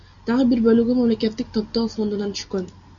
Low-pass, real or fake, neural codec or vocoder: 7.2 kHz; real; none